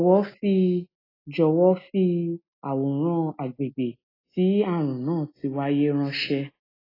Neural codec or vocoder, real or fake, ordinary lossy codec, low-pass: none; real; AAC, 24 kbps; 5.4 kHz